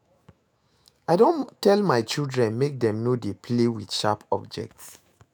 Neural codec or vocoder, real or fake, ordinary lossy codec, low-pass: autoencoder, 48 kHz, 128 numbers a frame, DAC-VAE, trained on Japanese speech; fake; none; none